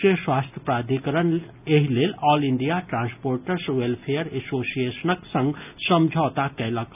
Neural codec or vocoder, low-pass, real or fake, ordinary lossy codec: none; 3.6 kHz; real; none